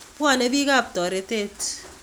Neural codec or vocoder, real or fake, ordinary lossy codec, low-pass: none; real; none; none